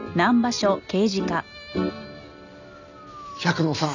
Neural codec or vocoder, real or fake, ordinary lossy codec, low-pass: none; real; none; 7.2 kHz